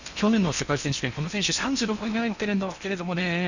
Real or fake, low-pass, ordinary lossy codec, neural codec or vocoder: fake; 7.2 kHz; none; codec, 16 kHz in and 24 kHz out, 0.8 kbps, FocalCodec, streaming, 65536 codes